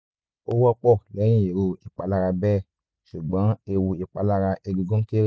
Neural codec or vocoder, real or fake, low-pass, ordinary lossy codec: none; real; none; none